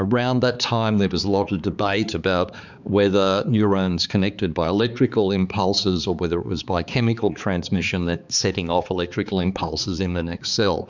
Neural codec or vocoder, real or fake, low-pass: codec, 16 kHz, 4 kbps, X-Codec, HuBERT features, trained on balanced general audio; fake; 7.2 kHz